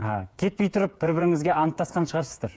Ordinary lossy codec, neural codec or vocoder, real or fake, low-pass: none; codec, 16 kHz, 8 kbps, FreqCodec, smaller model; fake; none